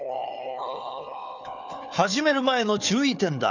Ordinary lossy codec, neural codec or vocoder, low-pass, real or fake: none; codec, 16 kHz, 4 kbps, FunCodec, trained on Chinese and English, 50 frames a second; 7.2 kHz; fake